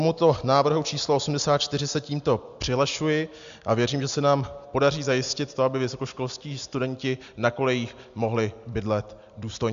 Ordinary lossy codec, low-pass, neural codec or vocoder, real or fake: MP3, 64 kbps; 7.2 kHz; none; real